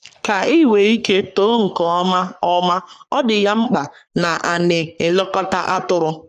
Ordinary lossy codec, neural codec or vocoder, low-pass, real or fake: none; codec, 44.1 kHz, 3.4 kbps, Pupu-Codec; 14.4 kHz; fake